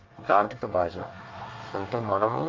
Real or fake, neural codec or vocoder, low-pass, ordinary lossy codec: fake; codec, 24 kHz, 1 kbps, SNAC; 7.2 kHz; Opus, 32 kbps